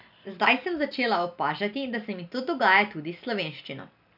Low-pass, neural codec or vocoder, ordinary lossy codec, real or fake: 5.4 kHz; none; none; real